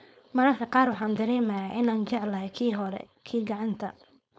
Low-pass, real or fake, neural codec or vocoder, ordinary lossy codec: none; fake; codec, 16 kHz, 4.8 kbps, FACodec; none